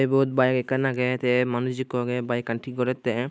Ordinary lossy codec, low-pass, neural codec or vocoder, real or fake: none; none; none; real